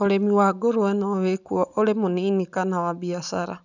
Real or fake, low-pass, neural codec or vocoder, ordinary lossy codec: real; 7.2 kHz; none; none